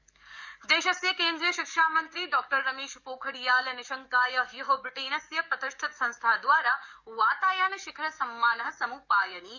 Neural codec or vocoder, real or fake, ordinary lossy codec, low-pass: codec, 44.1 kHz, 7.8 kbps, DAC; fake; none; 7.2 kHz